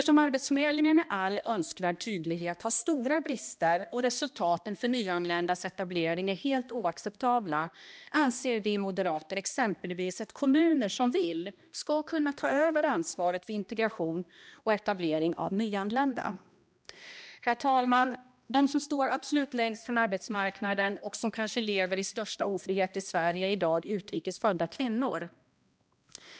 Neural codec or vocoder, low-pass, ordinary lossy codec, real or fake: codec, 16 kHz, 1 kbps, X-Codec, HuBERT features, trained on balanced general audio; none; none; fake